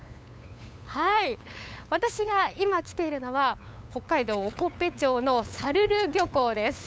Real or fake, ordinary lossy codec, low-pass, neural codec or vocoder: fake; none; none; codec, 16 kHz, 8 kbps, FunCodec, trained on LibriTTS, 25 frames a second